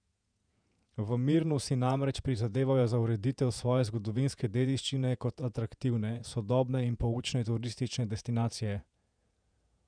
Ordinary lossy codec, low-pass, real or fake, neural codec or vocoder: none; 9.9 kHz; fake; vocoder, 48 kHz, 128 mel bands, Vocos